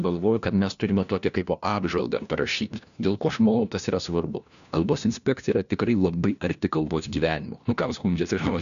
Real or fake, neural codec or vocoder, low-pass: fake; codec, 16 kHz, 1 kbps, FunCodec, trained on LibriTTS, 50 frames a second; 7.2 kHz